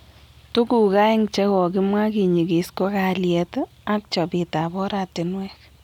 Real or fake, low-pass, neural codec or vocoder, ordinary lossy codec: real; 19.8 kHz; none; none